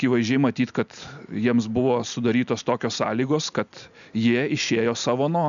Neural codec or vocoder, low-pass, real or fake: none; 7.2 kHz; real